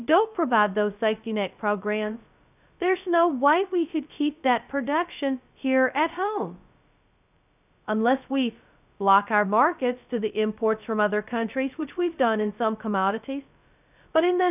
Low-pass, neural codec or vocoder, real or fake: 3.6 kHz; codec, 16 kHz, 0.2 kbps, FocalCodec; fake